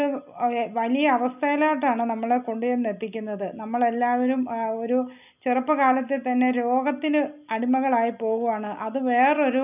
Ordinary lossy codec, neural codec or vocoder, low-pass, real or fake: none; none; 3.6 kHz; real